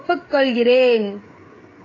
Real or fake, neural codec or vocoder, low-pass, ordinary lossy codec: fake; codec, 16 kHz, 8 kbps, FreqCodec, smaller model; 7.2 kHz; AAC, 32 kbps